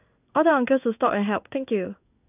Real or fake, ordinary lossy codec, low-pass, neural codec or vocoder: fake; none; 3.6 kHz; codec, 16 kHz in and 24 kHz out, 1 kbps, XY-Tokenizer